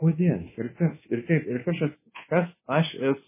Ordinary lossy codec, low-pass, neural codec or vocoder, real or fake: MP3, 16 kbps; 3.6 kHz; codec, 24 kHz, 1.2 kbps, DualCodec; fake